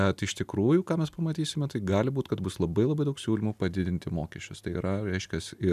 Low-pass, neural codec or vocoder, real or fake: 14.4 kHz; none; real